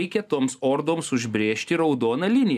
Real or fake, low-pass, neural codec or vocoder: real; 14.4 kHz; none